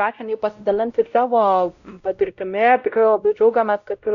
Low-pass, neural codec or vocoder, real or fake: 7.2 kHz; codec, 16 kHz, 0.5 kbps, X-Codec, WavLM features, trained on Multilingual LibriSpeech; fake